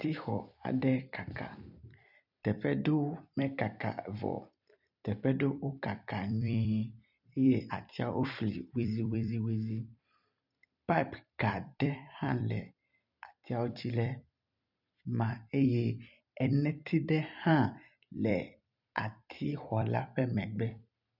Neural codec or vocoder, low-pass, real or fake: vocoder, 44.1 kHz, 128 mel bands every 256 samples, BigVGAN v2; 5.4 kHz; fake